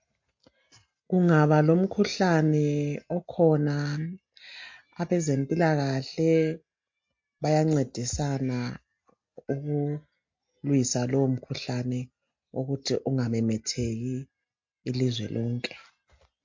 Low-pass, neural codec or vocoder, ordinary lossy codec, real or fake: 7.2 kHz; none; MP3, 48 kbps; real